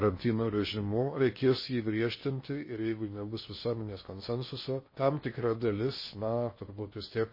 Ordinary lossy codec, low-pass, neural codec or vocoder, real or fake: MP3, 24 kbps; 5.4 kHz; codec, 16 kHz in and 24 kHz out, 0.8 kbps, FocalCodec, streaming, 65536 codes; fake